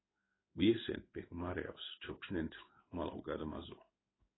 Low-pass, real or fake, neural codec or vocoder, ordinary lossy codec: 7.2 kHz; fake; codec, 16 kHz in and 24 kHz out, 1 kbps, XY-Tokenizer; AAC, 16 kbps